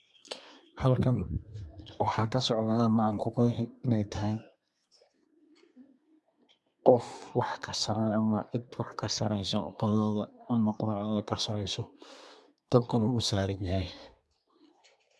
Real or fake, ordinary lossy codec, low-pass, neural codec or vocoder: fake; none; none; codec, 24 kHz, 1 kbps, SNAC